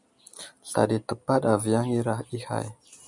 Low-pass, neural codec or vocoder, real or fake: 10.8 kHz; none; real